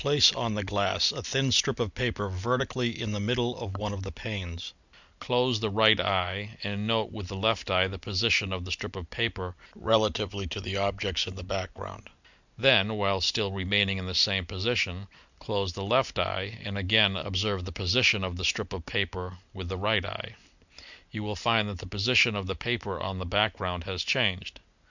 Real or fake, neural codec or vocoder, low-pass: real; none; 7.2 kHz